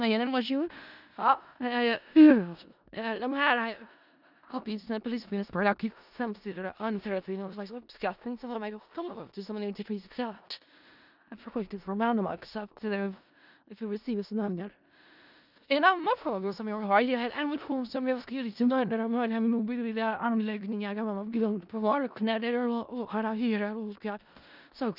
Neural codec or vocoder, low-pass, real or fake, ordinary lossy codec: codec, 16 kHz in and 24 kHz out, 0.4 kbps, LongCat-Audio-Codec, four codebook decoder; 5.4 kHz; fake; none